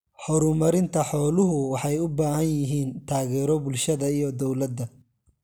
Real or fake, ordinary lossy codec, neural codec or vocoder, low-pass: real; none; none; none